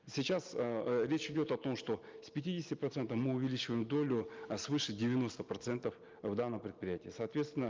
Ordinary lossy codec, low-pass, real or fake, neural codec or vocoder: Opus, 24 kbps; 7.2 kHz; real; none